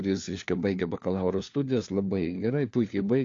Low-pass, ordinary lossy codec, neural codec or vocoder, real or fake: 7.2 kHz; AAC, 48 kbps; codec, 16 kHz, 4 kbps, FunCodec, trained on LibriTTS, 50 frames a second; fake